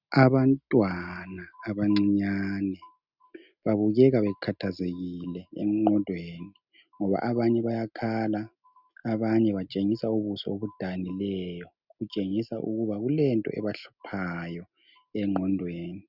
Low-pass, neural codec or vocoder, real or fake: 5.4 kHz; none; real